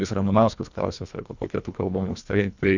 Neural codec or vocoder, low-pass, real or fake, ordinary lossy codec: codec, 24 kHz, 1.5 kbps, HILCodec; 7.2 kHz; fake; Opus, 64 kbps